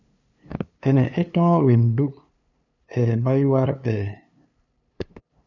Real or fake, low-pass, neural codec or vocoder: fake; 7.2 kHz; codec, 16 kHz, 2 kbps, FunCodec, trained on LibriTTS, 25 frames a second